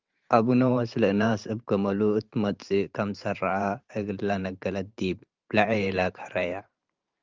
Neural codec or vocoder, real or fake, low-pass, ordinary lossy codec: vocoder, 44.1 kHz, 128 mel bands every 512 samples, BigVGAN v2; fake; 7.2 kHz; Opus, 32 kbps